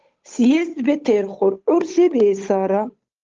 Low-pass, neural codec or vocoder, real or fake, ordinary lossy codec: 7.2 kHz; codec, 16 kHz, 8 kbps, FunCodec, trained on Chinese and English, 25 frames a second; fake; Opus, 32 kbps